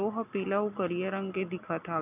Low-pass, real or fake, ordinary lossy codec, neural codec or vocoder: 3.6 kHz; real; none; none